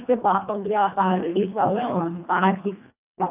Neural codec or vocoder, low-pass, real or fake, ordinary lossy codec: codec, 24 kHz, 1.5 kbps, HILCodec; 3.6 kHz; fake; none